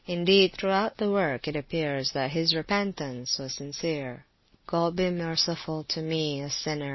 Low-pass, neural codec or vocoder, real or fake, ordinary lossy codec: 7.2 kHz; none; real; MP3, 24 kbps